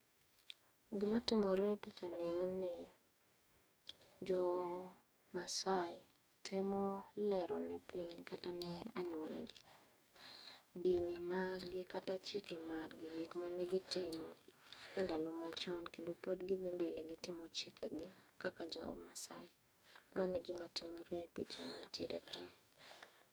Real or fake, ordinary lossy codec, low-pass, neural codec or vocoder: fake; none; none; codec, 44.1 kHz, 2.6 kbps, DAC